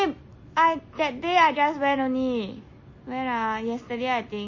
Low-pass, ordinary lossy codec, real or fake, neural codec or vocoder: 7.2 kHz; MP3, 32 kbps; real; none